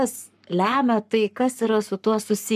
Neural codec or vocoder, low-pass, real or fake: codec, 44.1 kHz, 7.8 kbps, Pupu-Codec; 14.4 kHz; fake